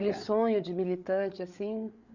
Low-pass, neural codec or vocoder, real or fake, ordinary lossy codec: 7.2 kHz; codec, 16 kHz, 8 kbps, FreqCodec, larger model; fake; none